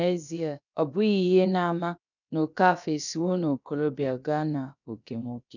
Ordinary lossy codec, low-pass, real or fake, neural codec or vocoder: none; 7.2 kHz; fake; codec, 16 kHz, about 1 kbps, DyCAST, with the encoder's durations